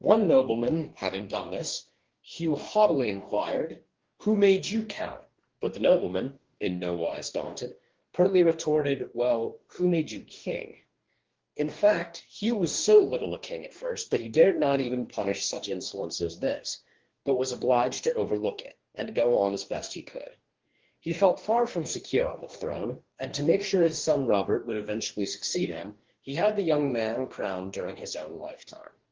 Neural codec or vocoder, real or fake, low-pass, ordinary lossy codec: codec, 44.1 kHz, 2.6 kbps, DAC; fake; 7.2 kHz; Opus, 16 kbps